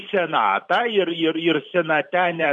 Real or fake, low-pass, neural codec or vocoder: fake; 10.8 kHz; vocoder, 44.1 kHz, 128 mel bands every 512 samples, BigVGAN v2